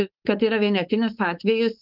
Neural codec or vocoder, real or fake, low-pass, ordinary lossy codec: codec, 16 kHz, 4.8 kbps, FACodec; fake; 5.4 kHz; Opus, 24 kbps